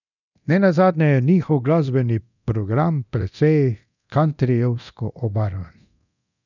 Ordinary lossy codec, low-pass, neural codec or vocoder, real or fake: none; 7.2 kHz; codec, 24 kHz, 0.9 kbps, DualCodec; fake